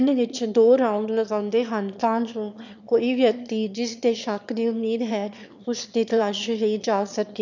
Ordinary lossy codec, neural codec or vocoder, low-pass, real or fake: none; autoencoder, 22.05 kHz, a latent of 192 numbers a frame, VITS, trained on one speaker; 7.2 kHz; fake